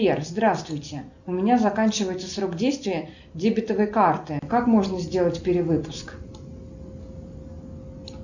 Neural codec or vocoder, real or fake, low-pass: none; real; 7.2 kHz